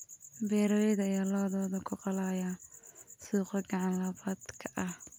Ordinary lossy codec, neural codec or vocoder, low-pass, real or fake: none; none; none; real